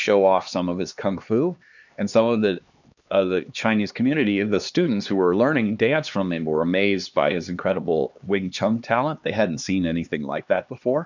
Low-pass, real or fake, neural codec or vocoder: 7.2 kHz; fake; codec, 16 kHz, 2 kbps, X-Codec, HuBERT features, trained on LibriSpeech